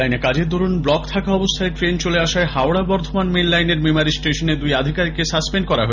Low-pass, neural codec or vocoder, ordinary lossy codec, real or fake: 7.2 kHz; none; none; real